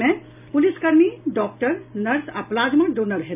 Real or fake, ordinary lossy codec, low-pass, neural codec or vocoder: real; none; 3.6 kHz; none